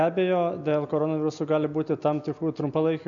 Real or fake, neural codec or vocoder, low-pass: real; none; 7.2 kHz